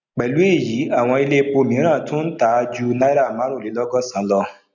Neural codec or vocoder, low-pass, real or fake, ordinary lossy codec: none; 7.2 kHz; real; none